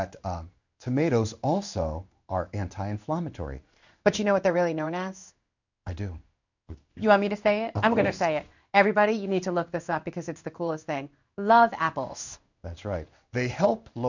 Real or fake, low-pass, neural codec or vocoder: fake; 7.2 kHz; codec, 16 kHz in and 24 kHz out, 1 kbps, XY-Tokenizer